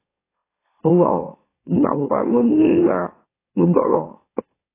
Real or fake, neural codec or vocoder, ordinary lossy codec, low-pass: fake; autoencoder, 44.1 kHz, a latent of 192 numbers a frame, MeloTTS; AAC, 16 kbps; 3.6 kHz